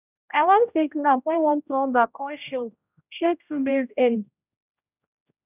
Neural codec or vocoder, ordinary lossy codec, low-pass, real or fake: codec, 16 kHz, 0.5 kbps, X-Codec, HuBERT features, trained on general audio; none; 3.6 kHz; fake